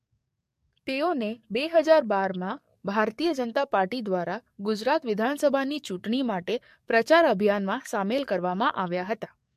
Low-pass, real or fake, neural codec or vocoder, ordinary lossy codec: 14.4 kHz; fake; codec, 44.1 kHz, 7.8 kbps, DAC; MP3, 64 kbps